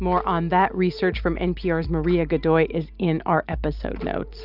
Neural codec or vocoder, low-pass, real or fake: none; 5.4 kHz; real